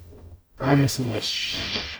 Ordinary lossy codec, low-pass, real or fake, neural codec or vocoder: none; none; fake; codec, 44.1 kHz, 0.9 kbps, DAC